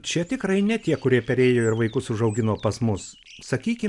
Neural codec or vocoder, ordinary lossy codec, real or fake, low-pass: none; MP3, 96 kbps; real; 10.8 kHz